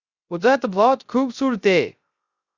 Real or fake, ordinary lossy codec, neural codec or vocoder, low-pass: fake; Opus, 64 kbps; codec, 16 kHz, 0.3 kbps, FocalCodec; 7.2 kHz